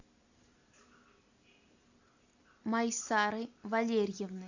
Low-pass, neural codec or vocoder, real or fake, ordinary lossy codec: 7.2 kHz; none; real; AAC, 48 kbps